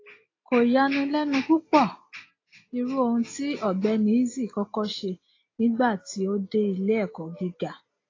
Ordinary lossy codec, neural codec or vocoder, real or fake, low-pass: AAC, 32 kbps; none; real; 7.2 kHz